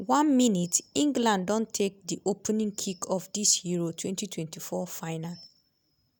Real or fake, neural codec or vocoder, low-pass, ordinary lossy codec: real; none; none; none